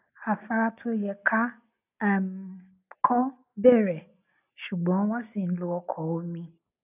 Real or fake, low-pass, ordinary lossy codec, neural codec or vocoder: real; 3.6 kHz; none; none